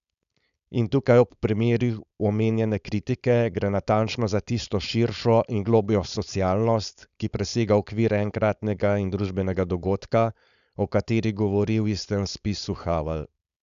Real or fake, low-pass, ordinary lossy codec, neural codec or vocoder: fake; 7.2 kHz; none; codec, 16 kHz, 4.8 kbps, FACodec